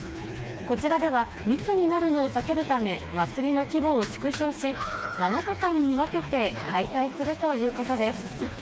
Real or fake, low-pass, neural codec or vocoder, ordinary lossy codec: fake; none; codec, 16 kHz, 2 kbps, FreqCodec, smaller model; none